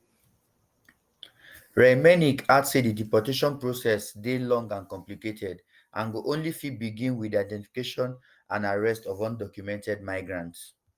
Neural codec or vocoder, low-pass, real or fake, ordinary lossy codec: none; 14.4 kHz; real; Opus, 32 kbps